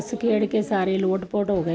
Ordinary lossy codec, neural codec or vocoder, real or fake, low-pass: none; none; real; none